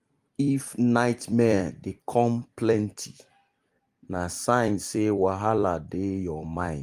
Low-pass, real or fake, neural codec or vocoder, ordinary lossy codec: 14.4 kHz; fake; vocoder, 44.1 kHz, 128 mel bands every 256 samples, BigVGAN v2; Opus, 32 kbps